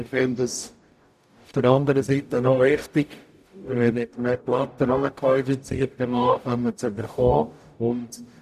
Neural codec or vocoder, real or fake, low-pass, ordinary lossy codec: codec, 44.1 kHz, 0.9 kbps, DAC; fake; 14.4 kHz; none